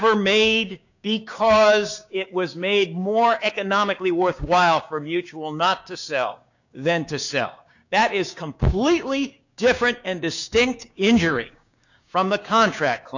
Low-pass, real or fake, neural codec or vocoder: 7.2 kHz; fake; codec, 16 kHz, 6 kbps, DAC